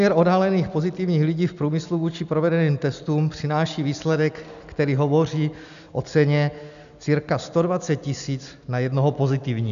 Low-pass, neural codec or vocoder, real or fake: 7.2 kHz; none; real